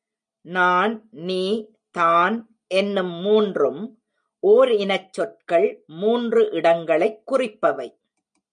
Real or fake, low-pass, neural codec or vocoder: real; 9.9 kHz; none